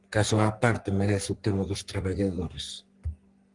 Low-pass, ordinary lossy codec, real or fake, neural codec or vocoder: 10.8 kHz; Opus, 32 kbps; fake; codec, 44.1 kHz, 3.4 kbps, Pupu-Codec